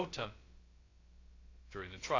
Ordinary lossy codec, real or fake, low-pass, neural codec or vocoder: AAC, 32 kbps; fake; 7.2 kHz; codec, 16 kHz, about 1 kbps, DyCAST, with the encoder's durations